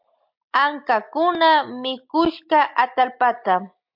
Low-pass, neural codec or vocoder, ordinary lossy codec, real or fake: 5.4 kHz; none; MP3, 48 kbps; real